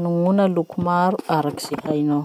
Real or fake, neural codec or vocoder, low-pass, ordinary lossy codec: real; none; 19.8 kHz; none